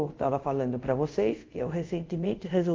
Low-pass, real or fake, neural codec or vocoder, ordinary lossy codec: 7.2 kHz; fake; codec, 24 kHz, 0.5 kbps, DualCodec; Opus, 24 kbps